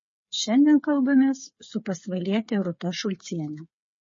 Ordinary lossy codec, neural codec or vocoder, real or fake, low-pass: MP3, 32 kbps; codec, 16 kHz, 8 kbps, FreqCodec, smaller model; fake; 7.2 kHz